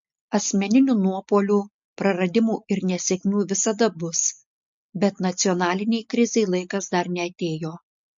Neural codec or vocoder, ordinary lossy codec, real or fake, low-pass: none; MP3, 48 kbps; real; 7.2 kHz